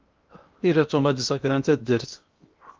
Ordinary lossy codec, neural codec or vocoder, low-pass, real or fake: Opus, 24 kbps; codec, 16 kHz in and 24 kHz out, 0.8 kbps, FocalCodec, streaming, 65536 codes; 7.2 kHz; fake